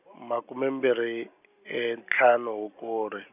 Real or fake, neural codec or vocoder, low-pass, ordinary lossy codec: real; none; 3.6 kHz; none